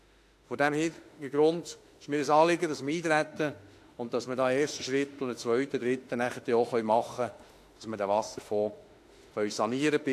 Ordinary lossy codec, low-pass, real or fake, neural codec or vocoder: AAC, 64 kbps; 14.4 kHz; fake; autoencoder, 48 kHz, 32 numbers a frame, DAC-VAE, trained on Japanese speech